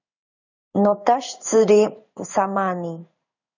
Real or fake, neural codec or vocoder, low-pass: fake; codec, 16 kHz in and 24 kHz out, 1 kbps, XY-Tokenizer; 7.2 kHz